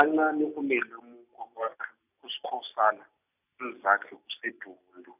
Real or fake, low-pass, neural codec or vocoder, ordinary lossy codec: real; 3.6 kHz; none; none